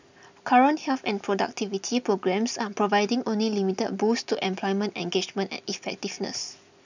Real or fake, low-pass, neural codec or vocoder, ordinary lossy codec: real; 7.2 kHz; none; none